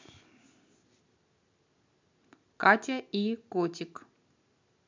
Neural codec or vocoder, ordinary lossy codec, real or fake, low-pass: none; MP3, 64 kbps; real; 7.2 kHz